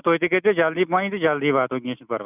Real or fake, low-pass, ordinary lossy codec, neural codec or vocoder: real; 3.6 kHz; none; none